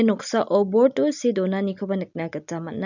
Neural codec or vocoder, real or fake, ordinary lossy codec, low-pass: none; real; none; 7.2 kHz